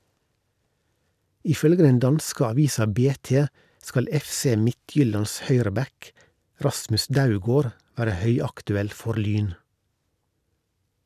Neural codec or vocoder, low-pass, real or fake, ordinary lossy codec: none; 14.4 kHz; real; none